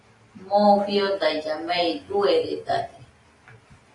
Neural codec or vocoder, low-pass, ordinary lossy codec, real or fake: none; 10.8 kHz; AAC, 32 kbps; real